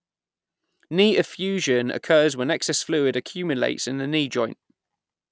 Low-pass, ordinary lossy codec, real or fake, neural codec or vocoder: none; none; real; none